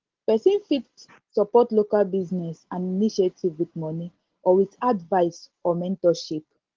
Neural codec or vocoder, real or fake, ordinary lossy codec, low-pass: none; real; Opus, 16 kbps; 7.2 kHz